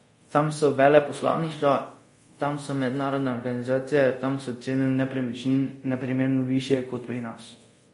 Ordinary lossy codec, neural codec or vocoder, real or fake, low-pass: MP3, 48 kbps; codec, 24 kHz, 0.5 kbps, DualCodec; fake; 10.8 kHz